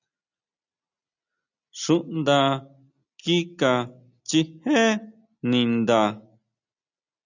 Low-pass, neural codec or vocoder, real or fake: 7.2 kHz; none; real